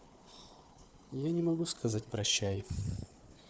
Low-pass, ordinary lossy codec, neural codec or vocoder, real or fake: none; none; codec, 16 kHz, 4 kbps, FunCodec, trained on Chinese and English, 50 frames a second; fake